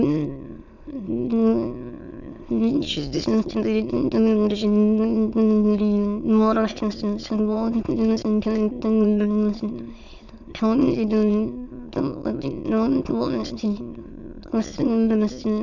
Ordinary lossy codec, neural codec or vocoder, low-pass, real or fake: none; autoencoder, 22.05 kHz, a latent of 192 numbers a frame, VITS, trained on many speakers; 7.2 kHz; fake